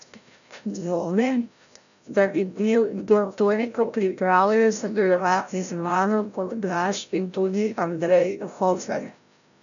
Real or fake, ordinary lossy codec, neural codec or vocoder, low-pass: fake; none; codec, 16 kHz, 0.5 kbps, FreqCodec, larger model; 7.2 kHz